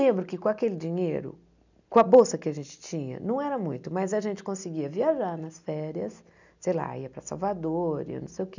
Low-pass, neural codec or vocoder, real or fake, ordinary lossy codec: 7.2 kHz; none; real; none